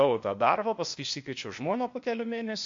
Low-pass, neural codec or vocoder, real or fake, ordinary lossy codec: 7.2 kHz; codec, 16 kHz, 0.8 kbps, ZipCodec; fake; MP3, 48 kbps